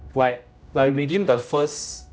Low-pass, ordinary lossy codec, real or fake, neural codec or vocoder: none; none; fake; codec, 16 kHz, 0.5 kbps, X-Codec, HuBERT features, trained on general audio